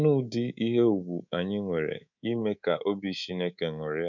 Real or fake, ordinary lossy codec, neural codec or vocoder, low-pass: fake; none; codec, 24 kHz, 3.1 kbps, DualCodec; 7.2 kHz